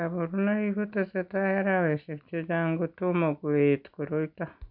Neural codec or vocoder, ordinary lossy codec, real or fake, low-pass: none; none; real; 5.4 kHz